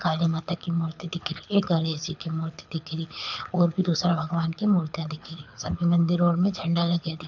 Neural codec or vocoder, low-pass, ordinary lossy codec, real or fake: codec, 24 kHz, 6 kbps, HILCodec; 7.2 kHz; none; fake